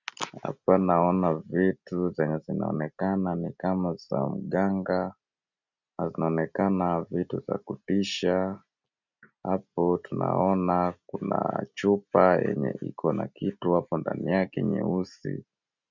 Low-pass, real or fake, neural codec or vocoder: 7.2 kHz; real; none